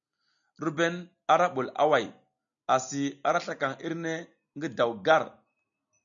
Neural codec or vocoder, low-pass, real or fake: none; 7.2 kHz; real